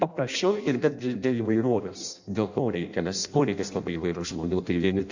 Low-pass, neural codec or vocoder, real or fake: 7.2 kHz; codec, 16 kHz in and 24 kHz out, 0.6 kbps, FireRedTTS-2 codec; fake